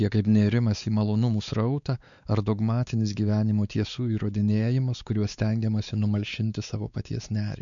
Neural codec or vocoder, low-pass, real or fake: codec, 16 kHz, 4 kbps, X-Codec, WavLM features, trained on Multilingual LibriSpeech; 7.2 kHz; fake